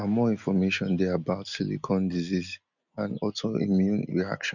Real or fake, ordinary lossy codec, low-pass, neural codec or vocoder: real; none; 7.2 kHz; none